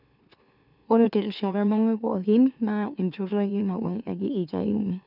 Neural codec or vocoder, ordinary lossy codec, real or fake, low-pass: autoencoder, 44.1 kHz, a latent of 192 numbers a frame, MeloTTS; none; fake; 5.4 kHz